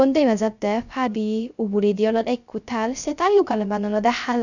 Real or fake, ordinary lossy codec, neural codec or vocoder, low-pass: fake; none; codec, 16 kHz, 0.3 kbps, FocalCodec; 7.2 kHz